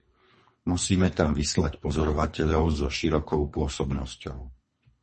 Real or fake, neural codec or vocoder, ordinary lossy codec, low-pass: fake; codec, 24 kHz, 3 kbps, HILCodec; MP3, 32 kbps; 10.8 kHz